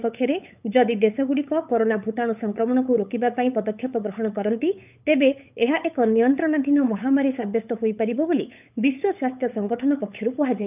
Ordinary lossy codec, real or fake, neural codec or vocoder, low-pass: none; fake; codec, 16 kHz, 8 kbps, FunCodec, trained on LibriTTS, 25 frames a second; 3.6 kHz